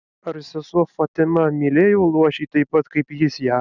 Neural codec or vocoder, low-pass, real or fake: none; 7.2 kHz; real